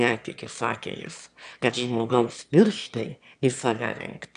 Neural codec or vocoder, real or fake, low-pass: autoencoder, 22.05 kHz, a latent of 192 numbers a frame, VITS, trained on one speaker; fake; 9.9 kHz